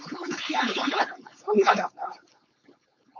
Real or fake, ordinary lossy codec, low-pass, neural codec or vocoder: fake; MP3, 48 kbps; 7.2 kHz; codec, 16 kHz, 4.8 kbps, FACodec